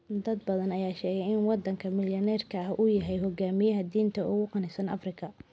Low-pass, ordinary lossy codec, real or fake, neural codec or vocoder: none; none; real; none